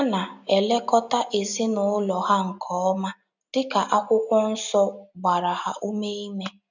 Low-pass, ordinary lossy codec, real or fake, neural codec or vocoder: 7.2 kHz; none; real; none